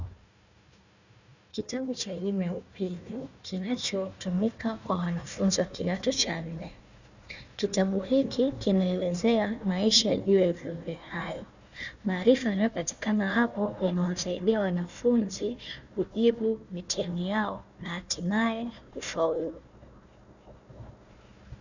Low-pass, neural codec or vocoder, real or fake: 7.2 kHz; codec, 16 kHz, 1 kbps, FunCodec, trained on Chinese and English, 50 frames a second; fake